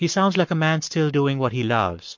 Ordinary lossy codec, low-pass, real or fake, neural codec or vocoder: MP3, 48 kbps; 7.2 kHz; fake; codec, 44.1 kHz, 7.8 kbps, Pupu-Codec